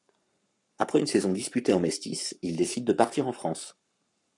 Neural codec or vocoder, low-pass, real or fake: codec, 44.1 kHz, 7.8 kbps, Pupu-Codec; 10.8 kHz; fake